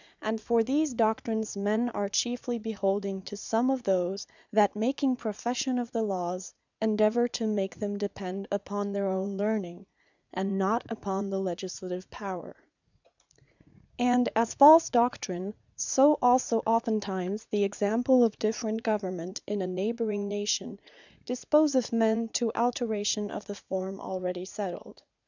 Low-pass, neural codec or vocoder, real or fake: 7.2 kHz; vocoder, 44.1 kHz, 80 mel bands, Vocos; fake